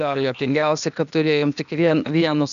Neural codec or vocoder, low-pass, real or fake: codec, 16 kHz, 0.8 kbps, ZipCodec; 7.2 kHz; fake